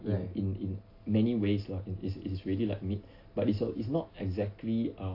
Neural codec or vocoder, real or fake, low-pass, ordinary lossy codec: none; real; 5.4 kHz; AAC, 32 kbps